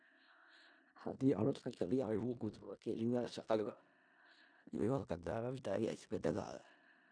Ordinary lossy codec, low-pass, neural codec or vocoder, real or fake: none; 9.9 kHz; codec, 16 kHz in and 24 kHz out, 0.4 kbps, LongCat-Audio-Codec, four codebook decoder; fake